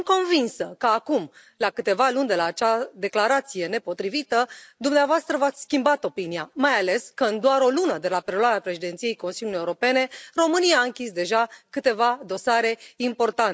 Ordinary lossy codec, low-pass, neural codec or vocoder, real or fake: none; none; none; real